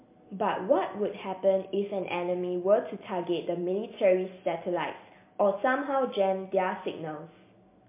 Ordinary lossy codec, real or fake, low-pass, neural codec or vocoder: MP3, 24 kbps; real; 3.6 kHz; none